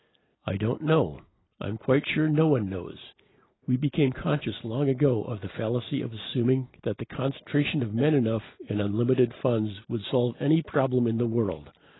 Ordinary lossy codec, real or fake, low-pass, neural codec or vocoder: AAC, 16 kbps; real; 7.2 kHz; none